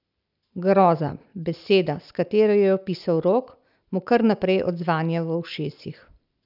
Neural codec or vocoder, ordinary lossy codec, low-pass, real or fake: none; none; 5.4 kHz; real